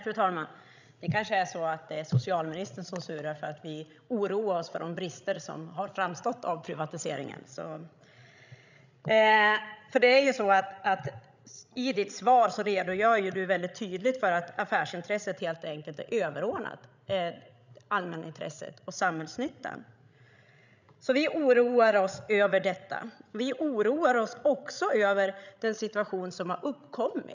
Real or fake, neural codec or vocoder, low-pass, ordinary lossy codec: fake; codec, 16 kHz, 16 kbps, FreqCodec, larger model; 7.2 kHz; none